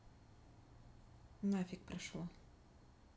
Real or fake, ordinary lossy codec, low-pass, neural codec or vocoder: real; none; none; none